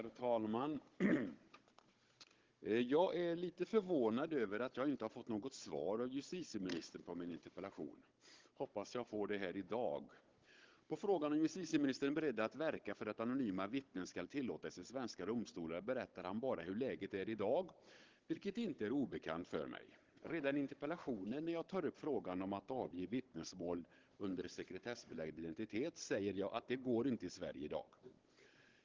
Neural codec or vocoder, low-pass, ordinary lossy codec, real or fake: codec, 16 kHz, 16 kbps, FunCodec, trained on Chinese and English, 50 frames a second; 7.2 kHz; Opus, 16 kbps; fake